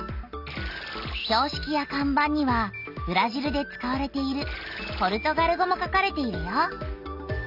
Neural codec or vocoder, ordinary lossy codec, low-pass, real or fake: none; none; 5.4 kHz; real